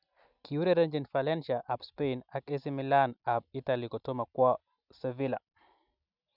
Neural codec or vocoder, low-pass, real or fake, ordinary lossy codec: none; 5.4 kHz; real; none